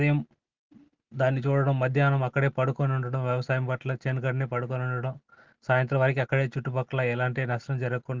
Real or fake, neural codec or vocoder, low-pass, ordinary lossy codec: real; none; 7.2 kHz; Opus, 16 kbps